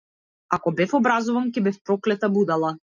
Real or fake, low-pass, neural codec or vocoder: real; 7.2 kHz; none